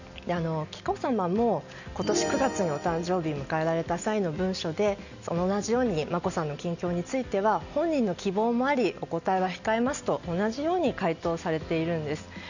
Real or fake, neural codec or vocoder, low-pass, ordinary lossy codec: real; none; 7.2 kHz; none